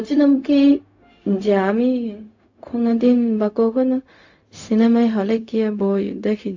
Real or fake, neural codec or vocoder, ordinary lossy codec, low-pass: fake; codec, 16 kHz, 0.4 kbps, LongCat-Audio-Codec; AAC, 48 kbps; 7.2 kHz